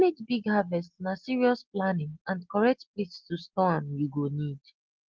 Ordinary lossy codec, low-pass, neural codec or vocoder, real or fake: Opus, 16 kbps; 7.2 kHz; none; real